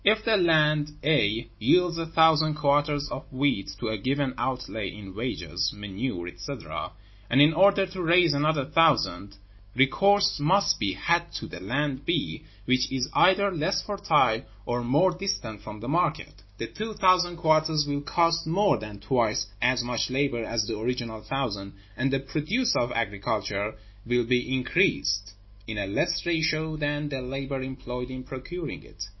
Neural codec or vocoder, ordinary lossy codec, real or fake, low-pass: none; MP3, 24 kbps; real; 7.2 kHz